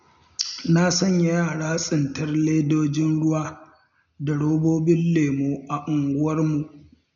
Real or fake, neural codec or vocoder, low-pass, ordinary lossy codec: real; none; 7.2 kHz; none